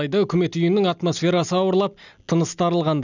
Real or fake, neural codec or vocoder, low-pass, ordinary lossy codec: real; none; 7.2 kHz; none